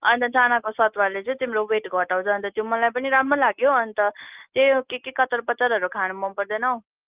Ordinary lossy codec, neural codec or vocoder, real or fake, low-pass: Opus, 24 kbps; none; real; 3.6 kHz